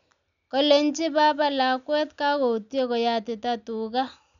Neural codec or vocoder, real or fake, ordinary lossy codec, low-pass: none; real; none; 7.2 kHz